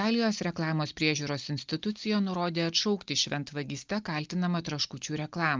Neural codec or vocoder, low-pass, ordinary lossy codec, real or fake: none; 7.2 kHz; Opus, 24 kbps; real